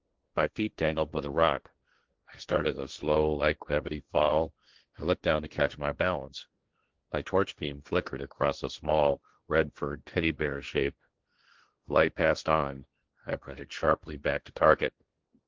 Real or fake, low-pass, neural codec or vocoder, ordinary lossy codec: fake; 7.2 kHz; codec, 16 kHz, 1.1 kbps, Voila-Tokenizer; Opus, 16 kbps